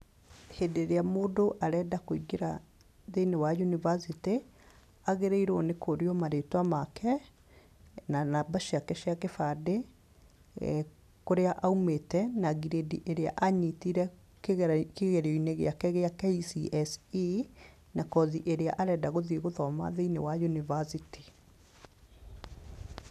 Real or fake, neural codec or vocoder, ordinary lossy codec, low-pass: real; none; none; 14.4 kHz